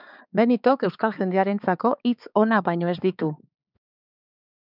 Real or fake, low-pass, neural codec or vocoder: fake; 5.4 kHz; codec, 16 kHz, 4 kbps, X-Codec, HuBERT features, trained on balanced general audio